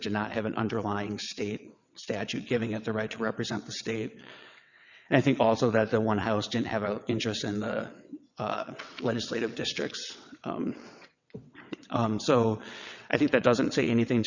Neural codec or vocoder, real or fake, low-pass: vocoder, 22.05 kHz, 80 mel bands, WaveNeXt; fake; 7.2 kHz